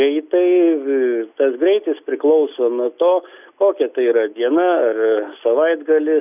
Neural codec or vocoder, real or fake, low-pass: none; real; 3.6 kHz